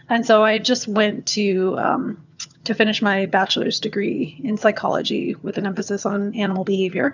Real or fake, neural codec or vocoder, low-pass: fake; vocoder, 22.05 kHz, 80 mel bands, HiFi-GAN; 7.2 kHz